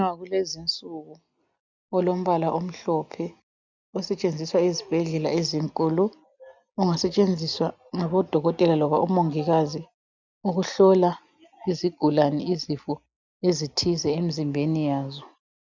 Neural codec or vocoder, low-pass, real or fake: none; 7.2 kHz; real